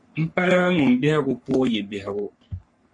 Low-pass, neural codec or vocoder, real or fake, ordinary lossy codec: 10.8 kHz; codec, 44.1 kHz, 3.4 kbps, Pupu-Codec; fake; MP3, 48 kbps